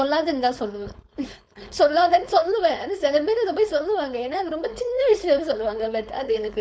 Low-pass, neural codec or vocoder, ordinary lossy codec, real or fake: none; codec, 16 kHz, 4.8 kbps, FACodec; none; fake